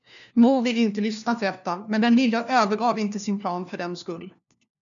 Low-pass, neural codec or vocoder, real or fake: 7.2 kHz; codec, 16 kHz, 1 kbps, FunCodec, trained on LibriTTS, 50 frames a second; fake